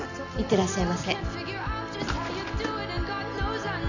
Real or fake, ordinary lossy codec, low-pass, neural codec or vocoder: real; none; 7.2 kHz; none